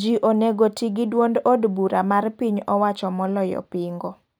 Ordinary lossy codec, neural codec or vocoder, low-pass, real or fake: none; none; none; real